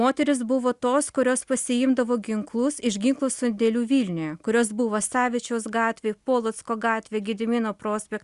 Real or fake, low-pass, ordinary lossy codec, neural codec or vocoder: real; 10.8 kHz; Opus, 64 kbps; none